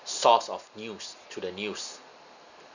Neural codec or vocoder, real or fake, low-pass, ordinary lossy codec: none; real; 7.2 kHz; none